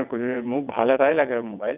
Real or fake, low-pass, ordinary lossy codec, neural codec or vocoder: fake; 3.6 kHz; none; vocoder, 22.05 kHz, 80 mel bands, WaveNeXt